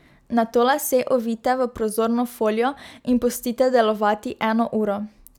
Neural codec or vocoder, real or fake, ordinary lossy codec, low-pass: none; real; none; 19.8 kHz